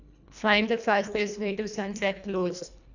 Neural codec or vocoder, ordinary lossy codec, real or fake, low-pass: codec, 24 kHz, 1.5 kbps, HILCodec; none; fake; 7.2 kHz